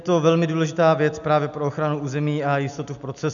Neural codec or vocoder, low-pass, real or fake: none; 7.2 kHz; real